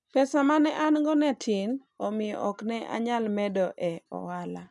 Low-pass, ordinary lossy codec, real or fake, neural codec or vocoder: 10.8 kHz; none; real; none